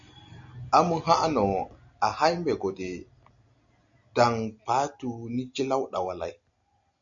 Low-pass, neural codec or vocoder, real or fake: 7.2 kHz; none; real